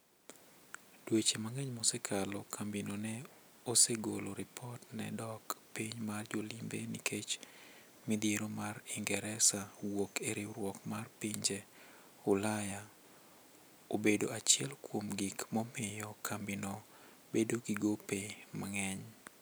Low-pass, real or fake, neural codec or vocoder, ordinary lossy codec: none; real; none; none